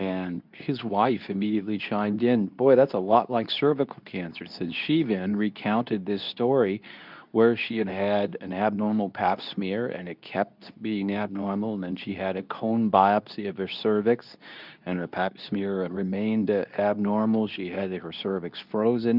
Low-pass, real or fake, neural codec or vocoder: 5.4 kHz; fake; codec, 24 kHz, 0.9 kbps, WavTokenizer, medium speech release version 2